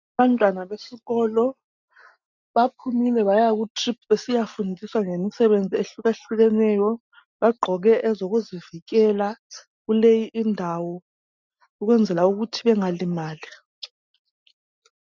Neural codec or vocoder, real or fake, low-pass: none; real; 7.2 kHz